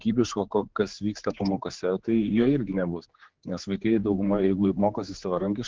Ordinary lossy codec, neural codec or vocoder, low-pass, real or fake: Opus, 16 kbps; codec, 16 kHz, 4 kbps, X-Codec, HuBERT features, trained on general audio; 7.2 kHz; fake